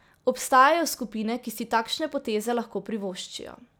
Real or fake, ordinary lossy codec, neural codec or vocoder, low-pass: real; none; none; none